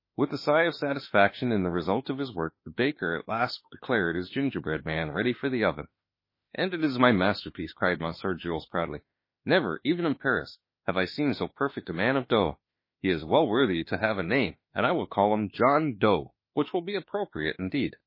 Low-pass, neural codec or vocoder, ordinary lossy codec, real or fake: 5.4 kHz; autoencoder, 48 kHz, 32 numbers a frame, DAC-VAE, trained on Japanese speech; MP3, 24 kbps; fake